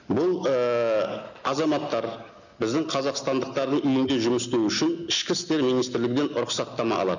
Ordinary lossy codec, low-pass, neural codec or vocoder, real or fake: none; 7.2 kHz; none; real